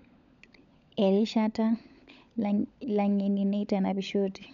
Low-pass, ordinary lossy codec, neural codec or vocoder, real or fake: 7.2 kHz; none; codec, 16 kHz, 16 kbps, FunCodec, trained on LibriTTS, 50 frames a second; fake